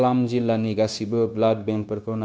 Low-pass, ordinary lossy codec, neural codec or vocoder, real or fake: none; none; codec, 16 kHz, 0.9 kbps, LongCat-Audio-Codec; fake